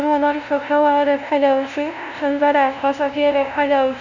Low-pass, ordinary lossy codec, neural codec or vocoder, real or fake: 7.2 kHz; none; codec, 16 kHz, 0.5 kbps, FunCodec, trained on LibriTTS, 25 frames a second; fake